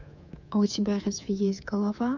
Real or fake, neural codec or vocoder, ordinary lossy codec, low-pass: fake; codec, 24 kHz, 3.1 kbps, DualCodec; none; 7.2 kHz